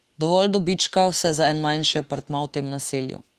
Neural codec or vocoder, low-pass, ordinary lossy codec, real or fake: autoencoder, 48 kHz, 32 numbers a frame, DAC-VAE, trained on Japanese speech; 14.4 kHz; Opus, 24 kbps; fake